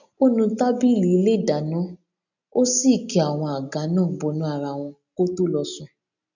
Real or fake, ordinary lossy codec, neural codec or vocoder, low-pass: real; none; none; 7.2 kHz